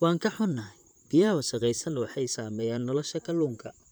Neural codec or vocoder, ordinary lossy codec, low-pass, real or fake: vocoder, 44.1 kHz, 128 mel bands, Pupu-Vocoder; none; none; fake